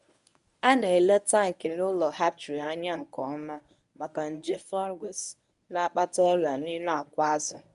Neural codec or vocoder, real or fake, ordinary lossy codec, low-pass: codec, 24 kHz, 0.9 kbps, WavTokenizer, medium speech release version 1; fake; none; 10.8 kHz